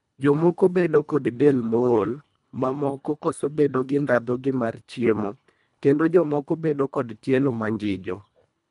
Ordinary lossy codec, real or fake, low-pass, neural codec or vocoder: none; fake; 10.8 kHz; codec, 24 kHz, 1.5 kbps, HILCodec